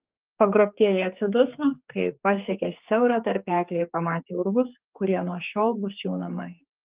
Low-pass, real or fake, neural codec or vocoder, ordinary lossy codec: 3.6 kHz; fake; codec, 16 kHz, 4 kbps, X-Codec, HuBERT features, trained on general audio; Opus, 32 kbps